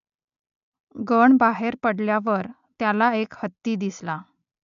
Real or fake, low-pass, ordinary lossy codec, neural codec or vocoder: real; 7.2 kHz; none; none